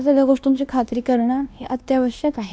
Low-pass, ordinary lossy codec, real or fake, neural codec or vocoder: none; none; fake; codec, 16 kHz, 1 kbps, X-Codec, WavLM features, trained on Multilingual LibriSpeech